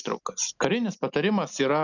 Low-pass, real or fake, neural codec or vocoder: 7.2 kHz; real; none